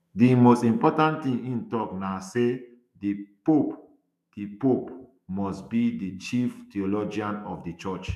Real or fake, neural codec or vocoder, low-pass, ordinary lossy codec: fake; autoencoder, 48 kHz, 128 numbers a frame, DAC-VAE, trained on Japanese speech; 14.4 kHz; none